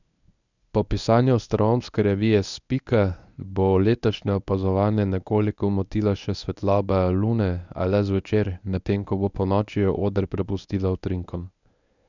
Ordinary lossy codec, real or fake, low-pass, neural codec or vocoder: none; fake; 7.2 kHz; codec, 24 kHz, 0.9 kbps, WavTokenizer, medium speech release version 1